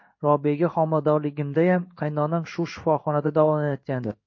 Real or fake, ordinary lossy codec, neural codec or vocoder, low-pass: fake; MP3, 32 kbps; codec, 16 kHz in and 24 kHz out, 1 kbps, XY-Tokenizer; 7.2 kHz